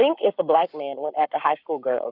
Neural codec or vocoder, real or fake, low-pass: codec, 44.1 kHz, 7.8 kbps, Pupu-Codec; fake; 5.4 kHz